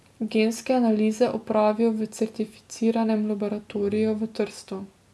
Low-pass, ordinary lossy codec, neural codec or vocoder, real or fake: none; none; none; real